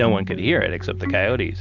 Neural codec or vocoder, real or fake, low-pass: none; real; 7.2 kHz